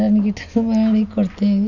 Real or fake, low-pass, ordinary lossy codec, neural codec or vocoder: real; 7.2 kHz; none; none